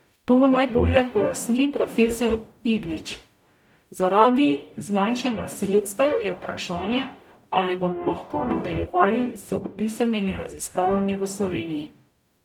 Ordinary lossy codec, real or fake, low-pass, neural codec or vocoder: none; fake; 19.8 kHz; codec, 44.1 kHz, 0.9 kbps, DAC